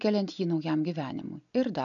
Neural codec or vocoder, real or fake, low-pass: none; real; 7.2 kHz